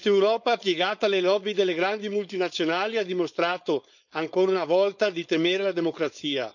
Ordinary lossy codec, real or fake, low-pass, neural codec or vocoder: none; fake; 7.2 kHz; codec, 16 kHz, 4.8 kbps, FACodec